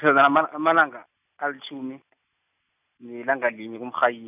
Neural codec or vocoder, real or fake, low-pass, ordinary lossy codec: none; real; 3.6 kHz; none